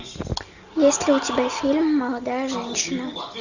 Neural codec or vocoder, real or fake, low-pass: none; real; 7.2 kHz